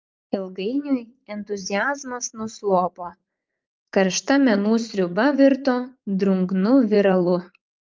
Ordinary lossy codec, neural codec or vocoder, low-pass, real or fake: Opus, 32 kbps; vocoder, 44.1 kHz, 80 mel bands, Vocos; 7.2 kHz; fake